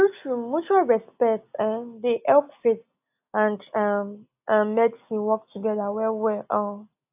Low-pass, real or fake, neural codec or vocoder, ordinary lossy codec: 3.6 kHz; real; none; AAC, 32 kbps